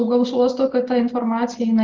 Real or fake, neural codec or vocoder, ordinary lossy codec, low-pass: real; none; Opus, 16 kbps; 7.2 kHz